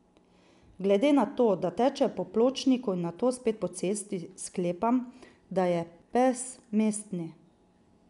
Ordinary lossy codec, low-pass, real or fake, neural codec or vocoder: none; 10.8 kHz; real; none